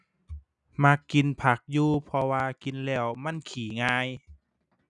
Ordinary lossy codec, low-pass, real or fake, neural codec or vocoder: none; 10.8 kHz; real; none